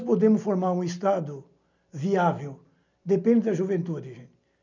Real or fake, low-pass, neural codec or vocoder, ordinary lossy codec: real; 7.2 kHz; none; none